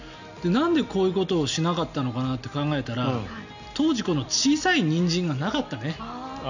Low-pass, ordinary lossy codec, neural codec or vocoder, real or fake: 7.2 kHz; none; none; real